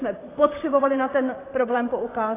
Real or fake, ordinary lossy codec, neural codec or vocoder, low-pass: fake; AAC, 16 kbps; vocoder, 44.1 kHz, 128 mel bands, Pupu-Vocoder; 3.6 kHz